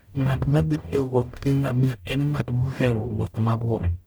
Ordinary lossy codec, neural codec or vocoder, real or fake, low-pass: none; codec, 44.1 kHz, 0.9 kbps, DAC; fake; none